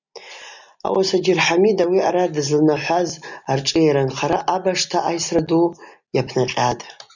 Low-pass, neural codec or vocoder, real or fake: 7.2 kHz; none; real